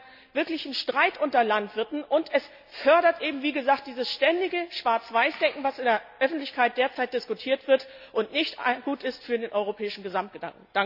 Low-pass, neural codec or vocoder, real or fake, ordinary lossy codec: 5.4 kHz; none; real; none